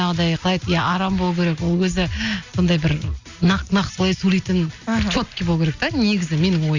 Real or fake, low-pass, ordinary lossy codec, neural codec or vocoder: real; 7.2 kHz; Opus, 64 kbps; none